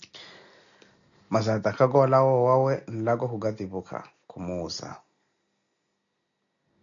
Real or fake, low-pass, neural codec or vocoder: real; 7.2 kHz; none